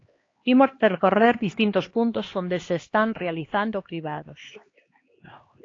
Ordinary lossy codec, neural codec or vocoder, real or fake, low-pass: AAC, 32 kbps; codec, 16 kHz, 2 kbps, X-Codec, HuBERT features, trained on LibriSpeech; fake; 7.2 kHz